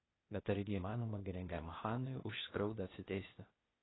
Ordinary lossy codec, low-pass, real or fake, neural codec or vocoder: AAC, 16 kbps; 7.2 kHz; fake; codec, 16 kHz, 0.8 kbps, ZipCodec